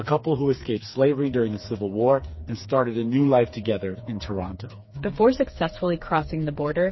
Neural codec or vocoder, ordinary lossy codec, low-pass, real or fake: codec, 16 kHz, 4 kbps, FreqCodec, smaller model; MP3, 24 kbps; 7.2 kHz; fake